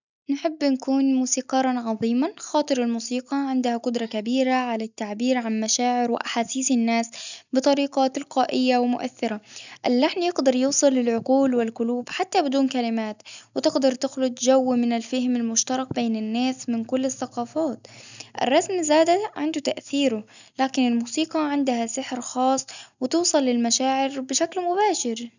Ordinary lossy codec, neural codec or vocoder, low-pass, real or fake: none; none; 7.2 kHz; real